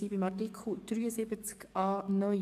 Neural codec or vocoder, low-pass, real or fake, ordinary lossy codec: codec, 44.1 kHz, 7.8 kbps, DAC; 14.4 kHz; fake; AAC, 96 kbps